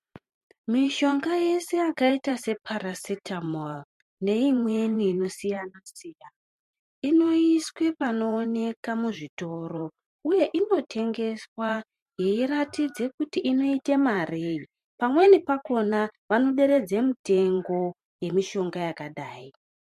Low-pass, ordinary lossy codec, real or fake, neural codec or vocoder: 14.4 kHz; MP3, 64 kbps; fake; vocoder, 44.1 kHz, 128 mel bands every 512 samples, BigVGAN v2